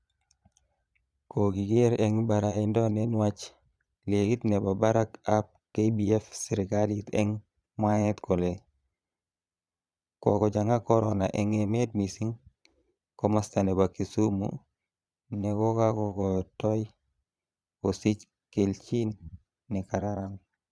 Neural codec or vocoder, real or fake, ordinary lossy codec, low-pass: vocoder, 22.05 kHz, 80 mel bands, Vocos; fake; none; none